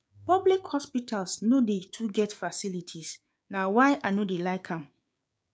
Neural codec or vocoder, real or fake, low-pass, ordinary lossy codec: codec, 16 kHz, 6 kbps, DAC; fake; none; none